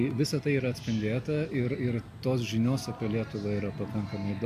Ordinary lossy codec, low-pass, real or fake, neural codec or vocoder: MP3, 96 kbps; 14.4 kHz; real; none